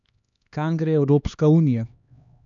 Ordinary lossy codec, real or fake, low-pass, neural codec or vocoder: none; fake; 7.2 kHz; codec, 16 kHz, 2 kbps, X-Codec, HuBERT features, trained on LibriSpeech